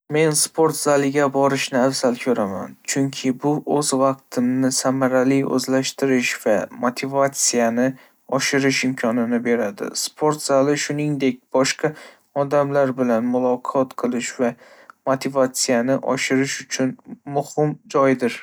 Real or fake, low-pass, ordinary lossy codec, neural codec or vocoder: real; none; none; none